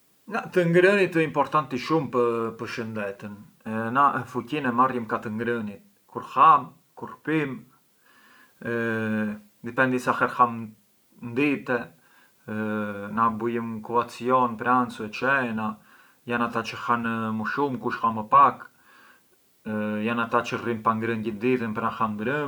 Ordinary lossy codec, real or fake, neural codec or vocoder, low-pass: none; real; none; none